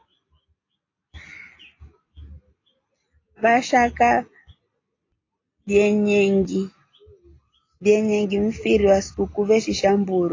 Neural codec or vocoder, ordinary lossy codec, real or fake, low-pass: none; AAC, 32 kbps; real; 7.2 kHz